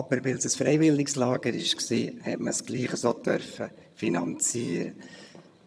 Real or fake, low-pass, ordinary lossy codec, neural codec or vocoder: fake; none; none; vocoder, 22.05 kHz, 80 mel bands, HiFi-GAN